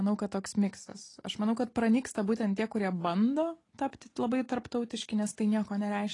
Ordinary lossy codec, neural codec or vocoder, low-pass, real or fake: AAC, 32 kbps; none; 10.8 kHz; real